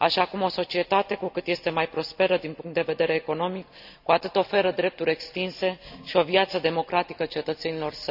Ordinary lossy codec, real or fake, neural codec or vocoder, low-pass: none; real; none; 5.4 kHz